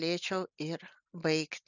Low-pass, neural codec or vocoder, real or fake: 7.2 kHz; none; real